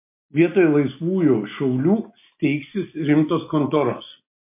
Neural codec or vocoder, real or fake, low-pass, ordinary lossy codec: none; real; 3.6 kHz; MP3, 24 kbps